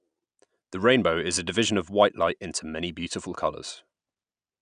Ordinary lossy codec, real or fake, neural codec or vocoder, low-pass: none; real; none; 9.9 kHz